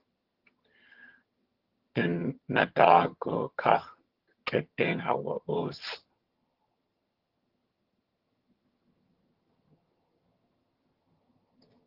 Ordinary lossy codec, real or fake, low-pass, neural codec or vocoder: Opus, 16 kbps; fake; 5.4 kHz; vocoder, 22.05 kHz, 80 mel bands, HiFi-GAN